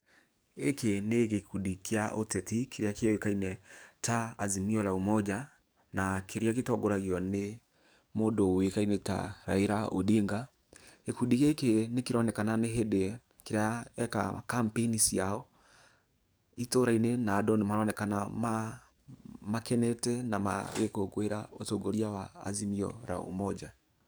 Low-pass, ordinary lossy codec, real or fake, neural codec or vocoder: none; none; fake; codec, 44.1 kHz, 7.8 kbps, DAC